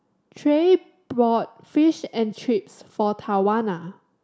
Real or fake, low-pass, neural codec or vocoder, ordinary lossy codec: real; none; none; none